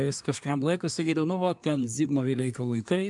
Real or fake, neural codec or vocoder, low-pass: fake; codec, 24 kHz, 1 kbps, SNAC; 10.8 kHz